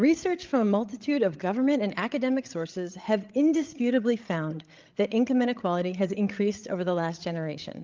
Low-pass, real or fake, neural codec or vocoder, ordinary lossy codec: 7.2 kHz; fake; codec, 16 kHz, 16 kbps, FreqCodec, larger model; Opus, 24 kbps